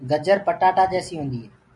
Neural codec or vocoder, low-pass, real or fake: none; 10.8 kHz; real